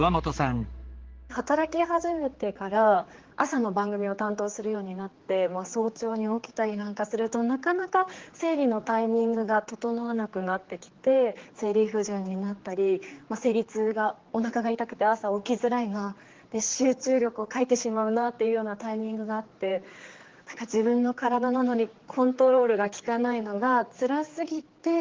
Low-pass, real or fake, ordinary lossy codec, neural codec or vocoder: 7.2 kHz; fake; Opus, 16 kbps; codec, 16 kHz, 4 kbps, X-Codec, HuBERT features, trained on general audio